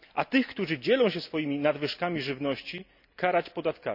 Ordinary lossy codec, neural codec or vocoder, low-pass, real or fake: none; none; 5.4 kHz; real